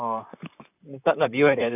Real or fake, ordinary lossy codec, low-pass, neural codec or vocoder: fake; none; 3.6 kHz; vocoder, 44.1 kHz, 128 mel bands, Pupu-Vocoder